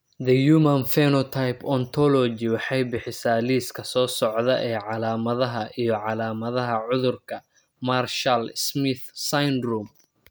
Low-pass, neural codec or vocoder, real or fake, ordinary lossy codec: none; none; real; none